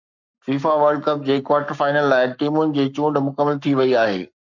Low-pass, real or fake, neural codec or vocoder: 7.2 kHz; fake; codec, 44.1 kHz, 7.8 kbps, Pupu-Codec